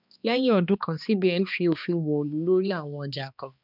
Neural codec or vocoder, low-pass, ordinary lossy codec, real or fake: codec, 16 kHz, 2 kbps, X-Codec, HuBERT features, trained on balanced general audio; 5.4 kHz; none; fake